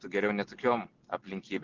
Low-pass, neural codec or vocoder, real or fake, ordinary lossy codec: 7.2 kHz; none; real; Opus, 16 kbps